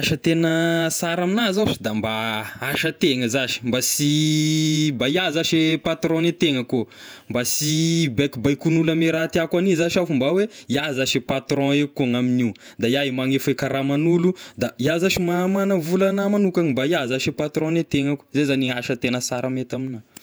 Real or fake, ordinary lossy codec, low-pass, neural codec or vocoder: fake; none; none; vocoder, 48 kHz, 128 mel bands, Vocos